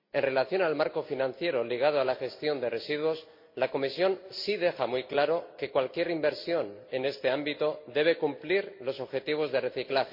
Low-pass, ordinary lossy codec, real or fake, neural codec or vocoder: 5.4 kHz; MP3, 32 kbps; real; none